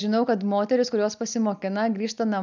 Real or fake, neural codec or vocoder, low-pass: real; none; 7.2 kHz